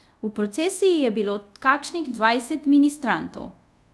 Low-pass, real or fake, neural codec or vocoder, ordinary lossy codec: none; fake; codec, 24 kHz, 0.5 kbps, DualCodec; none